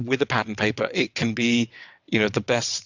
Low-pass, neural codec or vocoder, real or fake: 7.2 kHz; vocoder, 22.05 kHz, 80 mel bands, WaveNeXt; fake